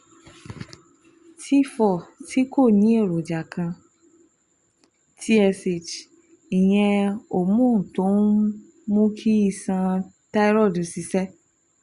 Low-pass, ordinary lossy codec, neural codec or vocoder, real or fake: 10.8 kHz; Opus, 64 kbps; none; real